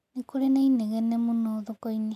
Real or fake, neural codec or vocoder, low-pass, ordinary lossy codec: real; none; 14.4 kHz; none